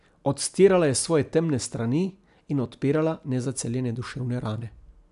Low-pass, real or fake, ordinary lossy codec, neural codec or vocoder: 10.8 kHz; real; none; none